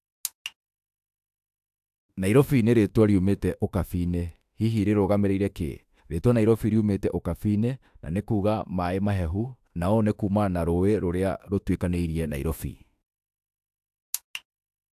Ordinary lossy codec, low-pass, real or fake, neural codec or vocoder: AAC, 96 kbps; 14.4 kHz; fake; autoencoder, 48 kHz, 32 numbers a frame, DAC-VAE, trained on Japanese speech